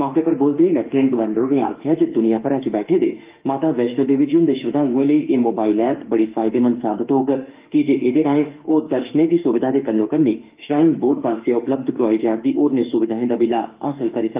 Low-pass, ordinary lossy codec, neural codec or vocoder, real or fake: 3.6 kHz; Opus, 32 kbps; autoencoder, 48 kHz, 32 numbers a frame, DAC-VAE, trained on Japanese speech; fake